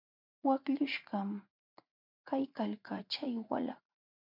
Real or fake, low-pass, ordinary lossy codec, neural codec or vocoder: fake; 5.4 kHz; MP3, 48 kbps; vocoder, 44.1 kHz, 80 mel bands, Vocos